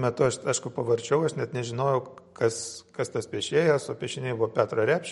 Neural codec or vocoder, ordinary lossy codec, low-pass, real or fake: none; MP3, 48 kbps; 19.8 kHz; real